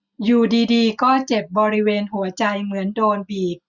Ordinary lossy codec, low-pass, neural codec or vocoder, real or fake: none; 7.2 kHz; none; real